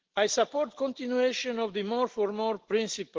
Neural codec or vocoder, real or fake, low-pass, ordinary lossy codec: none; real; 7.2 kHz; Opus, 16 kbps